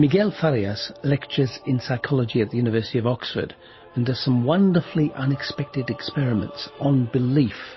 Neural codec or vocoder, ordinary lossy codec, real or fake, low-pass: none; MP3, 24 kbps; real; 7.2 kHz